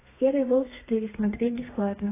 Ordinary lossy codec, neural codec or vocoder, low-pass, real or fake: AAC, 16 kbps; codec, 32 kHz, 1.9 kbps, SNAC; 3.6 kHz; fake